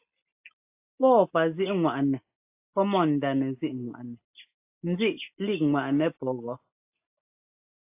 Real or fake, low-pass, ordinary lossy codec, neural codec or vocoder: real; 3.6 kHz; AAC, 32 kbps; none